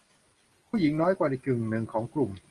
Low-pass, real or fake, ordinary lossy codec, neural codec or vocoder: 10.8 kHz; real; Opus, 24 kbps; none